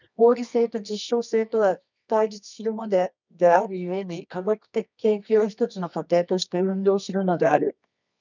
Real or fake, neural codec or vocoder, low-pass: fake; codec, 24 kHz, 0.9 kbps, WavTokenizer, medium music audio release; 7.2 kHz